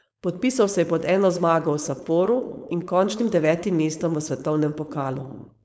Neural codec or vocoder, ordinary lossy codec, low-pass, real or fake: codec, 16 kHz, 4.8 kbps, FACodec; none; none; fake